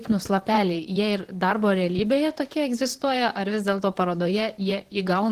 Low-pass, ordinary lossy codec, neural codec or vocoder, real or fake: 14.4 kHz; Opus, 16 kbps; vocoder, 44.1 kHz, 128 mel bands, Pupu-Vocoder; fake